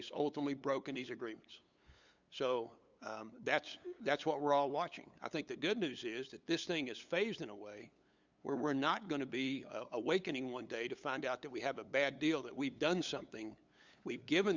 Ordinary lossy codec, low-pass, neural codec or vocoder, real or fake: Opus, 64 kbps; 7.2 kHz; codec, 16 kHz, 8 kbps, FunCodec, trained on LibriTTS, 25 frames a second; fake